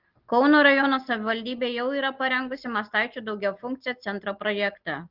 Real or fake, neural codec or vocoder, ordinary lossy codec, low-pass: real; none; Opus, 16 kbps; 5.4 kHz